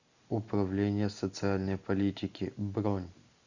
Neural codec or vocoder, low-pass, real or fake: none; 7.2 kHz; real